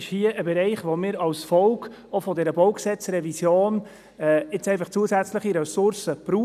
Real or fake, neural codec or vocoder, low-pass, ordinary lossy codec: real; none; 14.4 kHz; none